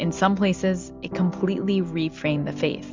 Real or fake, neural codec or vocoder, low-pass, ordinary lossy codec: real; none; 7.2 kHz; MP3, 64 kbps